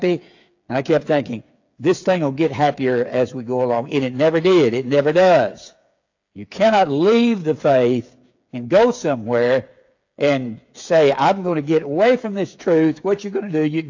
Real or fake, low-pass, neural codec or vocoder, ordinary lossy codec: fake; 7.2 kHz; codec, 16 kHz, 8 kbps, FreqCodec, smaller model; AAC, 48 kbps